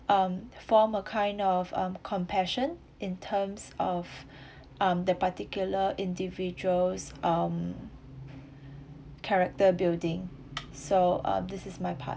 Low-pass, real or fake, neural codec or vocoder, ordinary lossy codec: none; real; none; none